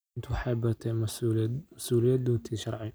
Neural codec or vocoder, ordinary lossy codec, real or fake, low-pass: none; none; real; none